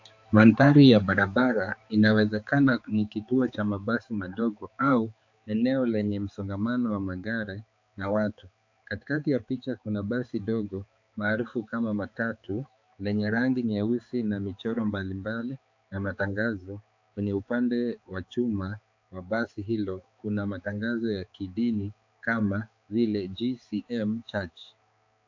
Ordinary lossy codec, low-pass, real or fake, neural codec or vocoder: AAC, 48 kbps; 7.2 kHz; fake; codec, 16 kHz, 4 kbps, X-Codec, HuBERT features, trained on balanced general audio